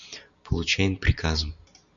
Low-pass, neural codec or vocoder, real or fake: 7.2 kHz; none; real